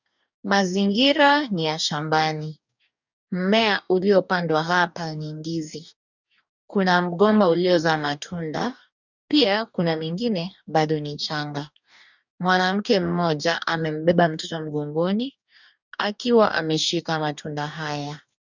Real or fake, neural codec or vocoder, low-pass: fake; codec, 44.1 kHz, 2.6 kbps, DAC; 7.2 kHz